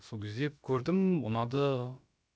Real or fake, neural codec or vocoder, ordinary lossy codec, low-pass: fake; codec, 16 kHz, about 1 kbps, DyCAST, with the encoder's durations; none; none